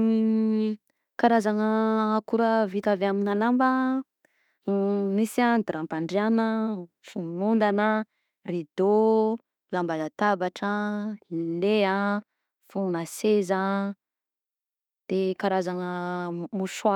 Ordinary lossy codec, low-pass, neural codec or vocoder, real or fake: none; 19.8 kHz; autoencoder, 48 kHz, 32 numbers a frame, DAC-VAE, trained on Japanese speech; fake